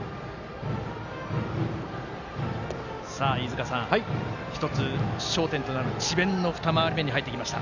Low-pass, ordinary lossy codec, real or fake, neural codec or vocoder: 7.2 kHz; none; real; none